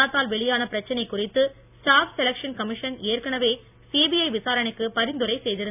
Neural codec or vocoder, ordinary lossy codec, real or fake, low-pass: none; none; real; 3.6 kHz